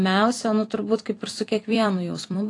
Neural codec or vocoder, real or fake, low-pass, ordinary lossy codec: vocoder, 44.1 kHz, 128 mel bands every 256 samples, BigVGAN v2; fake; 10.8 kHz; AAC, 48 kbps